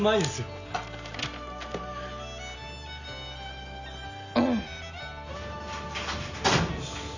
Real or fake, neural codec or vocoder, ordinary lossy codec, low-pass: real; none; none; 7.2 kHz